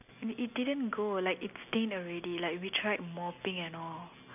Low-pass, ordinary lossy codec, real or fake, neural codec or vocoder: 3.6 kHz; none; real; none